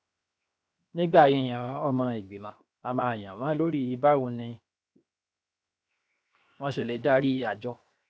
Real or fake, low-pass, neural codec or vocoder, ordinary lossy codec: fake; none; codec, 16 kHz, 0.7 kbps, FocalCodec; none